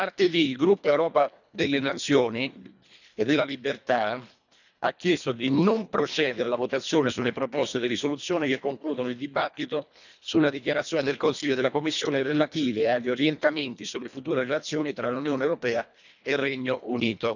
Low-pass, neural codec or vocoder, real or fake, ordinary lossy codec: 7.2 kHz; codec, 24 kHz, 1.5 kbps, HILCodec; fake; none